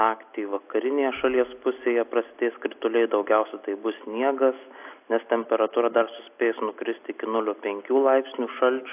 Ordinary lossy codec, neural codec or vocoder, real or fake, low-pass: AAC, 32 kbps; none; real; 3.6 kHz